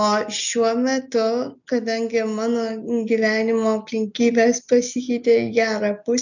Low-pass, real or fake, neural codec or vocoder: 7.2 kHz; real; none